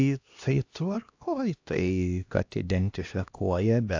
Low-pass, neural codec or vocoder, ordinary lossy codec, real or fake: 7.2 kHz; codec, 16 kHz, 1 kbps, X-Codec, HuBERT features, trained on LibriSpeech; AAC, 48 kbps; fake